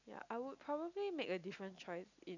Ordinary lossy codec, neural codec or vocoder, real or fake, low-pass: none; none; real; 7.2 kHz